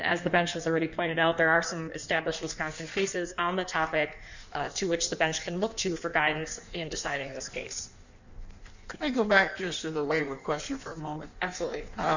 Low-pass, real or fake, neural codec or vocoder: 7.2 kHz; fake; codec, 16 kHz in and 24 kHz out, 1.1 kbps, FireRedTTS-2 codec